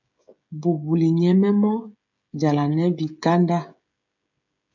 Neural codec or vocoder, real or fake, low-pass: codec, 16 kHz, 16 kbps, FreqCodec, smaller model; fake; 7.2 kHz